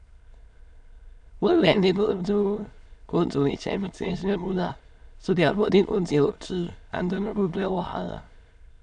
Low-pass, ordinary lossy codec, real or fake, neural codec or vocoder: 9.9 kHz; none; fake; autoencoder, 22.05 kHz, a latent of 192 numbers a frame, VITS, trained on many speakers